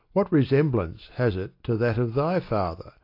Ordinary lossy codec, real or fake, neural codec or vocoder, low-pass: AAC, 32 kbps; real; none; 5.4 kHz